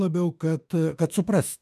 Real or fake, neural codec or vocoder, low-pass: fake; autoencoder, 48 kHz, 32 numbers a frame, DAC-VAE, trained on Japanese speech; 14.4 kHz